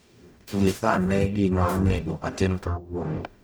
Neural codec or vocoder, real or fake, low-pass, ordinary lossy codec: codec, 44.1 kHz, 0.9 kbps, DAC; fake; none; none